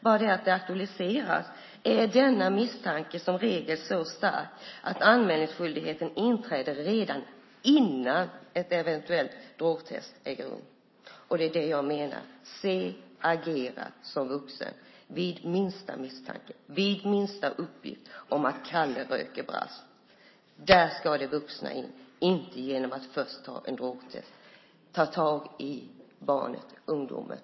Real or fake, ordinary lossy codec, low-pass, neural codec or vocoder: real; MP3, 24 kbps; 7.2 kHz; none